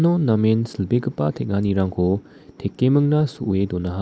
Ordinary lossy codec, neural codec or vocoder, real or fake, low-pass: none; none; real; none